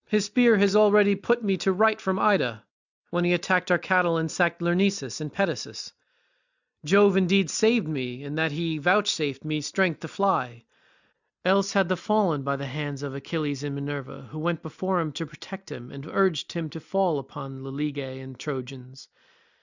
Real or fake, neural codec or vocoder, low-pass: real; none; 7.2 kHz